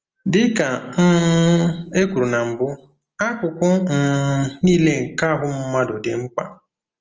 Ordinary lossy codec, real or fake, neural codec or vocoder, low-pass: Opus, 24 kbps; real; none; 7.2 kHz